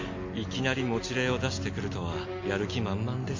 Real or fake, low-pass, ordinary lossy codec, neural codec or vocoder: real; 7.2 kHz; MP3, 48 kbps; none